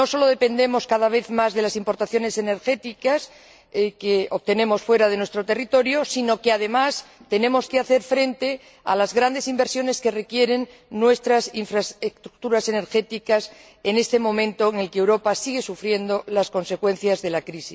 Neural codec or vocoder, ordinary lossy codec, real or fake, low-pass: none; none; real; none